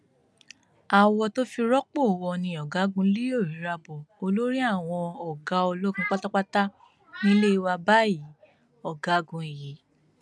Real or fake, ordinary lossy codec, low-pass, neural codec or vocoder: real; none; none; none